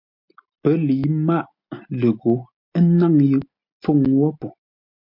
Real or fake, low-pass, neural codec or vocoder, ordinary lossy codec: real; 5.4 kHz; none; MP3, 48 kbps